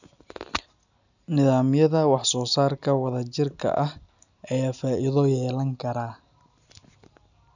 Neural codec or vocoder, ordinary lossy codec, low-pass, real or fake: none; none; 7.2 kHz; real